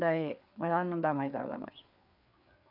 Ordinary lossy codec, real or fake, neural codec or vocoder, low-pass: none; fake; codec, 16 kHz, 4 kbps, FreqCodec, larger model; 5.4 kHz